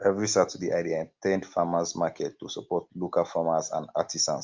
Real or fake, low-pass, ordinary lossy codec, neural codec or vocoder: real; 7.2 kHz; Opus, 24 kbps; none